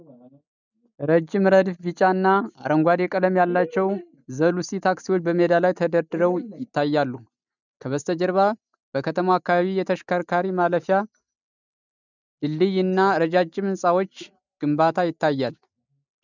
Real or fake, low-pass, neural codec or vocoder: real; 7.2 kHz; none